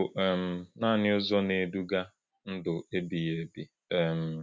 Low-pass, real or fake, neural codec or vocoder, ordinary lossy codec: none; real; none; none